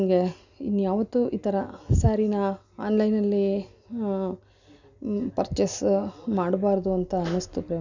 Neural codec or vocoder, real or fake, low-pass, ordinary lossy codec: none; real; 7.2 kHz; none